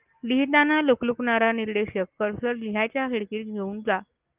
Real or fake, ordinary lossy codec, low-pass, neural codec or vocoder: real; Opus, 24 kbps; 3.6 kHz; none